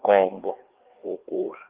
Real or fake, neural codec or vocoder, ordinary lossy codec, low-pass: fake; codec, 16 kHz, 2 kbps, FreqCodec, larger model; Opus, 16 kbps; 3.6 kHz